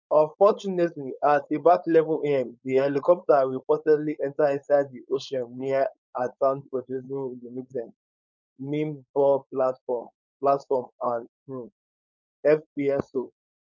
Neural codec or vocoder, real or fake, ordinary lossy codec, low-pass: codec, 16 kHz, 4.8 kbps, FACodec; fake; none; 7.2 kHz